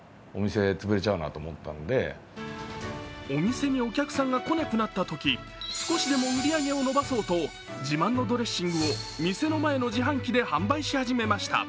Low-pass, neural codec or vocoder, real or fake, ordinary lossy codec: none; none; real; none